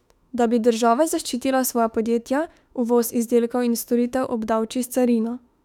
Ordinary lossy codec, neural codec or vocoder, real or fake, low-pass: none; autoencoder, 48 kHz, 32 numbers a frame, DAC-VAE, trained on Japanese speech; fake; 19.8 kHz